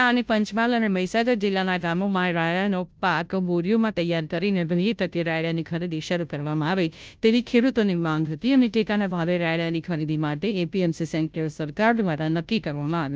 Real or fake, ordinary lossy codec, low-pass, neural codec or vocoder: fake; none; none; codec, 16 kHz, 0.5 kbps, FunCodec, trained on Chinese and English, 25 frames a second